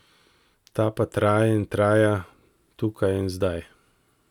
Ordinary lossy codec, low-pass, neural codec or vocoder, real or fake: none; 19.8 kHz; none; real